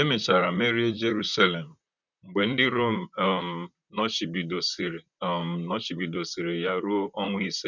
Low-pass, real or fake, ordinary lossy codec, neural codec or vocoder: 7.2 kHz; fake; none; vocoder, 44.1 kHz, 128 mel bands, Pupu-Vocoder